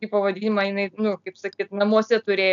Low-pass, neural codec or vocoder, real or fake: 7.2 kHz; none; real